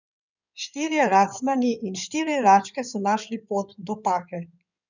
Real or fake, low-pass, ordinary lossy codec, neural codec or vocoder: fake; 7.2 kHz; none; codec, 16 kHz in and 24 kHz out, 2.2 kbps, FireRedTTS-2 codec